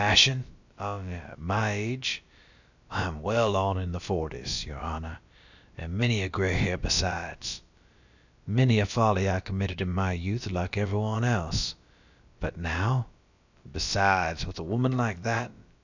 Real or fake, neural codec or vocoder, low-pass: fake; codec, 16 kHz, about 1 kbps, DyCAST, with the encoder's durations; 7.2 kHz